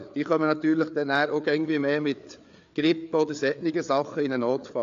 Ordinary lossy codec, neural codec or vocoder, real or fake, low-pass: AAC, 64 kbps; codec, 16 kHz, 4 kbps, FreqCodec, larger model; fake; 7.2 kHz